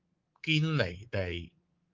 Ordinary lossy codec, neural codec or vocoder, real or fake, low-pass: Opus, 24 kbps; codec, 24 kHz, 3.1 kbps, DualCodec; fake; 7.2 kHz